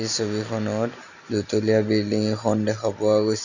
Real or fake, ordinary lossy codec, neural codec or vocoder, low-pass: real; none; none; 7.2 kHz